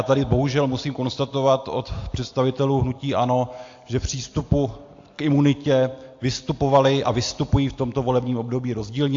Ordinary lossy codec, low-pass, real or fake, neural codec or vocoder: AAC, 48 kbps; 7.2 kHz; real; none